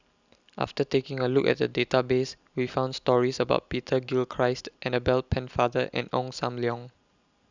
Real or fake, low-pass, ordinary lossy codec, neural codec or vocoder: real; 7.2 kHz; Opus, 64 kbps; none